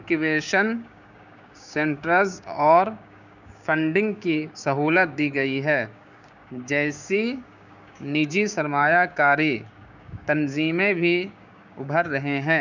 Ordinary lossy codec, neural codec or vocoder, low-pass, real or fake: none; codec, 16 kHz, 6 kbps, DAC; 7.2 kHz; fake